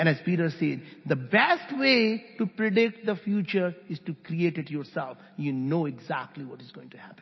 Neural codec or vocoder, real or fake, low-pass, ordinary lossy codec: none; real; 7.2 kHz; MP3, 24 kbps